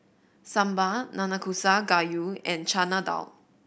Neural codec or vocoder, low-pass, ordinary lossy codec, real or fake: none; none; none; real